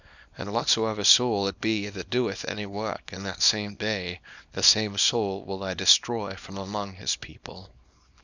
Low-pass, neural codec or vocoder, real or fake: 7.2 kHz; codec, 24 kHz, 0.9 kbps, WavTokenizer, small release; fake